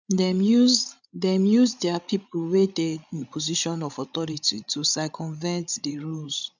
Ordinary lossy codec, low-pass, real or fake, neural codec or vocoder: none; 7.2 kHz; fake; codec, 16 kHz, 16 kbps, FreqCodec, larger model